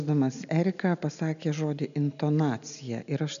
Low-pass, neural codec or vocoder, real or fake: 7.2 kHz; none; real